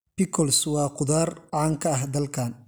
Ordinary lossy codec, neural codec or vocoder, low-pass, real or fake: none; none; none; real